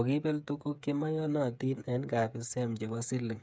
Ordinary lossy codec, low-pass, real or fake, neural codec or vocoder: none; none; fake; codec, 16 kHz, 8 kbps, FreqCodec, smaller model